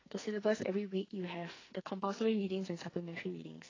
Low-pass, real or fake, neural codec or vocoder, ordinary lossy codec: 7.2 kHz; fake; codec, 44.1 kHz, 2.6 kbps, SNAC; AAC, 32 kbps